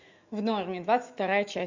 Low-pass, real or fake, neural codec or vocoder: 7.2 kHz; fake; autoencoder, 48 kHz, 128 numbers a frame, DAC-VAE, trained on Japanese speech